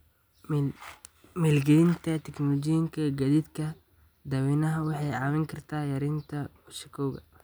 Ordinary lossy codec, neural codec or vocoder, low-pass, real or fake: none; none; none; real